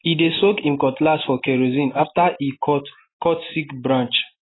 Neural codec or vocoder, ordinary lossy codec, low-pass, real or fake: none; AAC, 16 kbps; 7.2 kHz; real